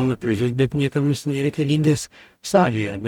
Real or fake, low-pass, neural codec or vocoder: fake; 19.8 kHz; codec, 44.1 kHz, 0.9 kbps, DAC